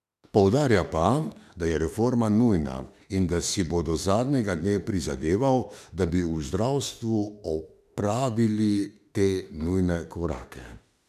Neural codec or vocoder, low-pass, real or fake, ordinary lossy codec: autoencoder, 48 kHz, 32 numbers a frame, DAC-VAE, trained on Japanese speech; 14.4 kHz; fake; none